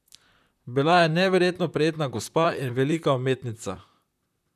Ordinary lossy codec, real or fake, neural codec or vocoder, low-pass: none; fake; vocoder, 44.1 kHz, 128 mel bands, Pupu-Vocoder; 14.4 kHz